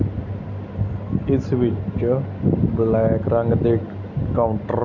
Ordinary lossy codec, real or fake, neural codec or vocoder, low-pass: none; real; none; 7.2 kHz